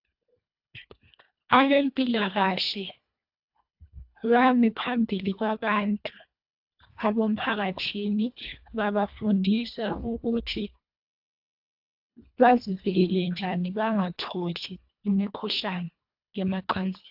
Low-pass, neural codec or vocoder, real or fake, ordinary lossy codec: 5.4 kHz; codec, 24 kHz, 1.5 kbps, HILCodec; fake; AAC, 48 kbps